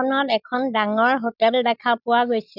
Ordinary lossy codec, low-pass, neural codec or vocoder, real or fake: none; 5.4 kHz; none; real